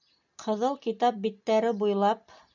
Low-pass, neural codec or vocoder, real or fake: 7.2 kHz; none; real